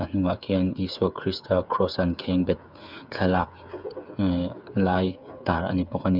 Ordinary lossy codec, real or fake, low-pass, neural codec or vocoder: none; fake; 5.4 kHz; codec, 16 kHz, 8 kbps, FreqCodec, smaller model